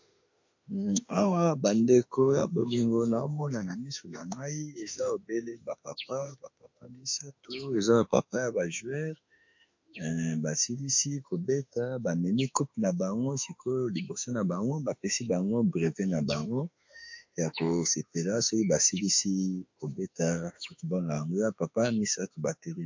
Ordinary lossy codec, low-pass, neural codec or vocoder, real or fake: MP3, 48 kbps; 7.2 kHz; autoencoder, 48 kHz, 32 numbers a frame, DAC-VAE, trained on Japanese speech; fake